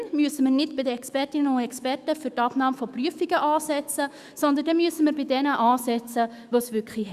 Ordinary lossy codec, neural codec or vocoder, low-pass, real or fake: Opus, 64 kbps; autoencoder, 48 kHz, 128 numbers a frame, DAC-VAE, trained on Japanese speech; 14.4 kHz; fake